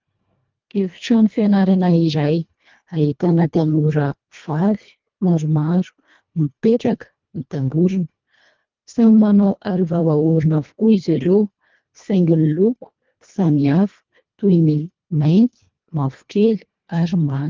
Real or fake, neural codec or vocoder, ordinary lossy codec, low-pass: fake; codec, 24 kHz, 1.5 kbps, HILCodec; Opus, 24 kbps; 7.2 kHz